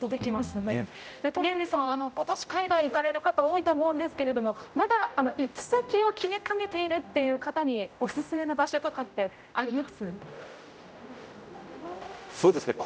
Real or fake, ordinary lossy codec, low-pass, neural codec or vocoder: fake; none; none; codec, 16 kHz, 0.5 kbps, X-Codec, HuBERT features, trained on general audio